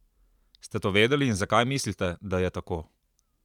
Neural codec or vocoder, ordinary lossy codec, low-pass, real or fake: vocoder, 44.1 kHz, 128 mel bands every 512 samples, BigVGAN v2; none; 19.8 kHz; fake